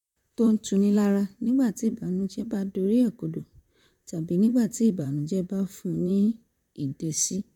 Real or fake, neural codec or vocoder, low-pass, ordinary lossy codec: fake; vocoder, 44.1 kHz, 128 mel bands, Pupu-Vocoder; 19.8 kHz; none